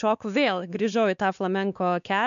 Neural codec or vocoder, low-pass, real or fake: codec, 16 kHz, 2 kbps, X-Codec, WavLM features, trained on Multilingual LibriSpeech; 7.2 kHz; fake